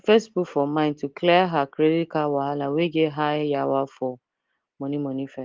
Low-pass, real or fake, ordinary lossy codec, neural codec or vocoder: 7.2 kHz; real; Opus, 16 kbps; none